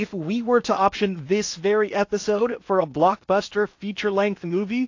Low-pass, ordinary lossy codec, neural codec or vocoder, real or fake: 7.2 kHz; AAC, 48 kbps; codec, 16 kHz in and 24 kHz out, 0.8 kbps, FocalCodec, streaming, 65536 codes; fake